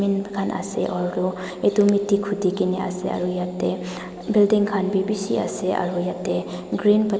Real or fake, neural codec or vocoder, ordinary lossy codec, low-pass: real; none; none; none